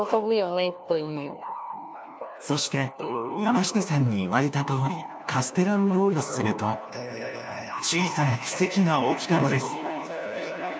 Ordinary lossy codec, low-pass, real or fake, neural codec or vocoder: none; none; fake; codec, 16 kHz, 1 kbps, FunCodec, trained on LibriTTS, 50 frames a second